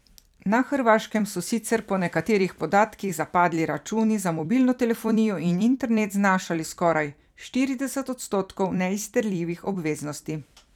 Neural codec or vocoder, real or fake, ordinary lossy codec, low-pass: vocoder, 44.1 kHz, 128 mel bands every 256 samples, BigVGAN v2; fake; none; 19.8 kHz